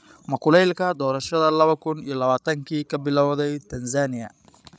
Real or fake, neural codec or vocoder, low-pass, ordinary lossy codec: fake; codec, 16 kHz, 16 kbps, FreqCodec, larger model; none; none